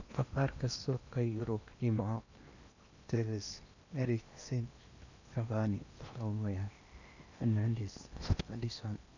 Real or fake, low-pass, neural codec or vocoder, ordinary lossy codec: fake; 7.2 kHz; codec, 16 kHz in and 24 kHz out, 0.8 kbps, FocalCodec, streaming, 65536 codes; none